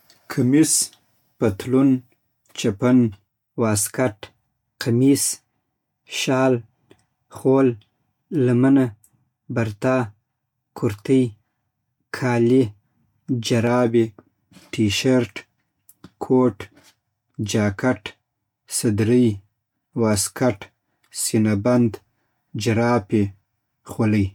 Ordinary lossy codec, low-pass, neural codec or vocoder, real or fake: MP3, 96 kbps; 19.8 kHz; none; real